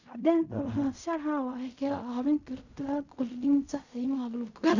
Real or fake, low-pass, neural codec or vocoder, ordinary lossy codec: fake; 7.2 kHz; codec, 16 kHz in and 24 kHz out, 0.4 kbps, LongCat-Audio-Codec, fine tuned four codebook decoder; none